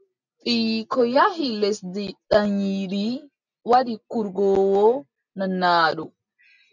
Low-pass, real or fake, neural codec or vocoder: 7.2 kHz; real; none